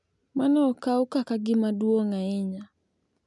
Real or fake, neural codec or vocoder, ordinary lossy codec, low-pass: real; none; none; 10.8 kHz